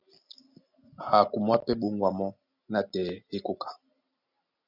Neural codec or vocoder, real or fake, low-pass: none; real; 5.4 kHz